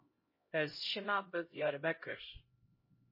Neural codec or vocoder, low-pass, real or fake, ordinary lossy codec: codec, 16 kHz, 0.5 kbps, X-Codec, HuBERT features, trained on LibriSpeech; 5.4 kHz; fake; MP3, 24 kbps